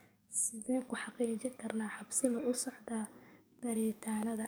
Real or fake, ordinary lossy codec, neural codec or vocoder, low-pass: fake; none; codec, 44.1 kHz, 7.8 kbps, DAC; none